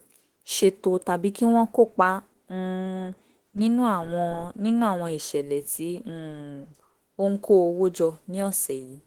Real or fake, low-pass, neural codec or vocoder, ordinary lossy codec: fake; 19.8 kHz; autoencoder, 48 kHz, 32 numbers a frame, DAC-VAE, trained on Japanese speech; Opus, 16 kbps